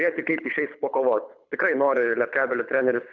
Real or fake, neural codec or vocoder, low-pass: fake; codec, 24 kHz, 6 kbps, HILCodec; 7.2 kHz